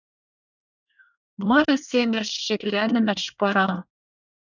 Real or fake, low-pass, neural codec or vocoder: fake; 7.2 kHz; codec, 24 kHz, 1 kbps, SNAC